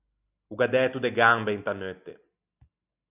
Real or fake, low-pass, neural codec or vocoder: real; 3.6 kHz; none